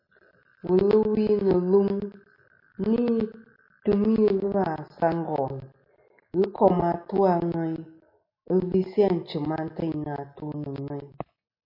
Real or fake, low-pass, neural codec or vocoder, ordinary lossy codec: real; 5.4 kHz; none; MP3, 32 kbps